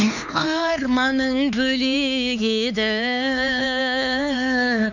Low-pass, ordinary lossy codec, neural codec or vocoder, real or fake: 7.2 kHz; none; codec, 16 kHz, 4 kbps, X-Codec, HuBERT features, trained on LibriSpeech; fake